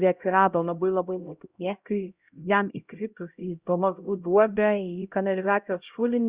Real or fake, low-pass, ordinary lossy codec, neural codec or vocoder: fake; 3.6 kHz; Opus, 64 kbps; codec, 16 kHz, 0.5 kbps, X-Codec, HuBERT features, trained on LibriSpeech